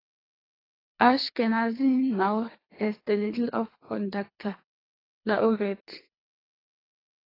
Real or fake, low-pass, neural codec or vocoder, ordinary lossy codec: fake; 5.4 kHz; codec, 24 kHz, 3 kbps, HILCodec; AAC, 24 kbps